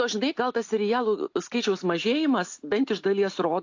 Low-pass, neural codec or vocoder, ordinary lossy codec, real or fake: 7.2 kHz; none; AAC, 48 kbps; real